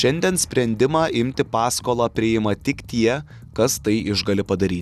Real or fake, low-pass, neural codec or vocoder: real; 14.4 kHz; none